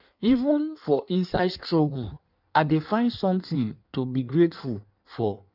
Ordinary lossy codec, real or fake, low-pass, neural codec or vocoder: none; fake; 5.4 kHz; codec, 16 kHz in and 24 kHz out, 1.1 kbps, FireRedTTS-2 codec